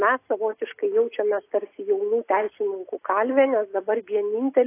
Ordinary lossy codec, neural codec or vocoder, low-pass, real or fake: AAC, 24 kbps; none; 3.6 kHz; real